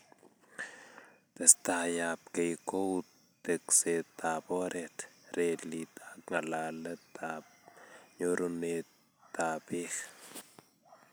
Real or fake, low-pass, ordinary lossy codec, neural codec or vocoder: real; none; none; none